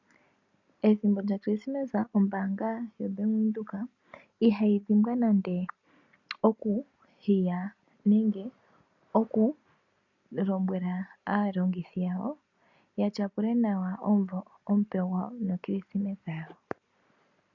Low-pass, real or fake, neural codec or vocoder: 7.2 kHz; real; none